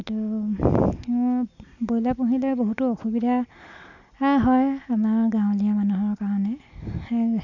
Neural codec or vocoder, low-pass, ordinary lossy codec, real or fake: none; 7.2 kHz; none; real